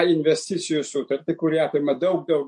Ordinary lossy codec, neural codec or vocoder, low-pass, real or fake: MP3, 48 kbps; none; 10.8 kHz; real